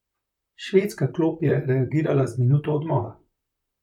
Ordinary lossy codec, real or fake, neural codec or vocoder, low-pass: none; fake; vocoder, 44.1 kHz, 128 mel bands, Pupu-Vocoder; 19.8 kHz